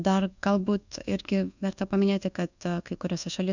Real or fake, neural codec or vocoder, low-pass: fake; codec, 24 kHz, 1.2 kbps, DualCodec; 7.2 kHz